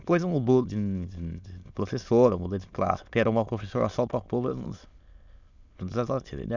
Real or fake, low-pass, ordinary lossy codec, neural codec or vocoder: fake; 7.2 kHz; none; autoencoder, 22.05 kHz, a latent of 192 numbers a frame, VITS, trained on many speakers